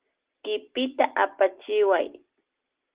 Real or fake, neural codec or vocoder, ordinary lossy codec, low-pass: real; none; Opus, 16 kbps; 3.6 kHz